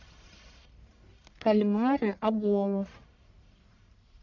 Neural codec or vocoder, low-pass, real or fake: codec, 44.1 kHz, 1.7 kbps, Pupu-Codec; 7.2 kHz; fake